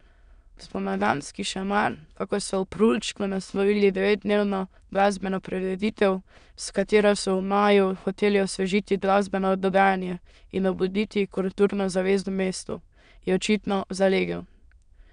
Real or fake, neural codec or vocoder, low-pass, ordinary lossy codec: fake; autoencoder, 22.05 kHz, a latent of 192 numbers a frame, VITS, trained on many speakers; 9.9 kHz; none